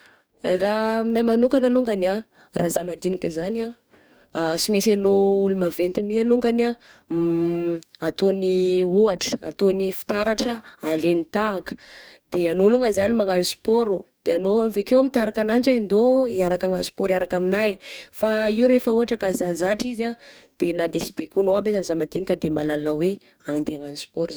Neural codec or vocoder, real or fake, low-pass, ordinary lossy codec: codec, 44.1 kHz, 2.6 kbps, DAC; fake; none; none